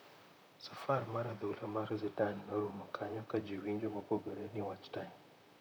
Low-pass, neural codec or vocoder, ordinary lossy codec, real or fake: none; vocoder, 44.1 kHz, 128 mel bands, Pupu-Vocoder; none; fake